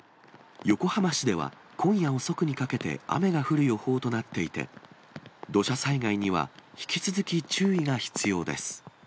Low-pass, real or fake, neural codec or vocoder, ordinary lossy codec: none; real; none; none